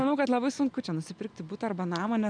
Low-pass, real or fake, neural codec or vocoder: 9.9 kHz; real; none